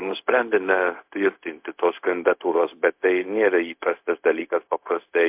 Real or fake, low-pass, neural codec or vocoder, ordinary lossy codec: fake; 3.6 kHz; codec, 16 kHz, 0.4 kbps, LongCat-Audio-Codec; MP3, 32 kbps